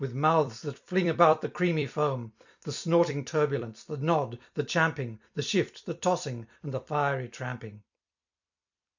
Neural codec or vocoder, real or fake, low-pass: vocoder, 44.1 kHz, 128 mel bands every 256 samples, BigVGAN v2; fake; 7.2 kHz